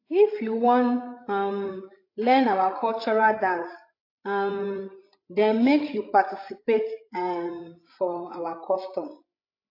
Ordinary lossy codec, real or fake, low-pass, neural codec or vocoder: AAC, 32 kbps; fake; 5.4 kHz; codec, 16 kHz, 16 kbps, FreqCodec, larger model